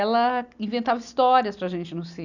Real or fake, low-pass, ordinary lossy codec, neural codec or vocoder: real; 7.2 kHz; none; none